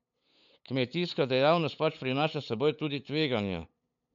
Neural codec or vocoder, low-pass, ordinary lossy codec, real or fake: codec, 16 kHz, 8 kbps, FunCodec, trained on LibriTTS, 25 frames a second; 7.2 kHz; none; fake